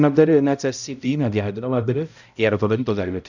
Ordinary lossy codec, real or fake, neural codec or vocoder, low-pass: none; fake; codec, 16 kHz, 0.5 kbps, X-Codec, HuBERT features, trained on balanced general audio; 7.2 kHz